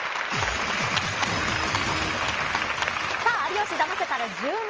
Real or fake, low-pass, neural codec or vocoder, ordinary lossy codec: real; 7.2 kHz; none; Opus, 24 kbps